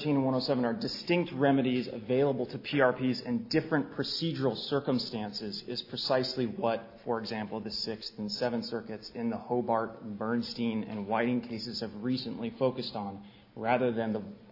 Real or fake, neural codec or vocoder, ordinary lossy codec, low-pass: real; none; AAC, 32 kbps; 5.4 kHz